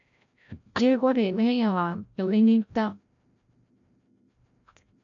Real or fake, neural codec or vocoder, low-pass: fake; codec, 16 kHz, 0.5 kbps, FreqCodec, larger model; 7.2 kHz